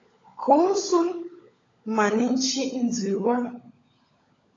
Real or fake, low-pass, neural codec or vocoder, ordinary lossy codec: fake; 7.2 kHz; codec, 16 kHz, 16 kbps, FunCodec, trained on LibriTTS, 50 frames a second; AAC, 32 kbps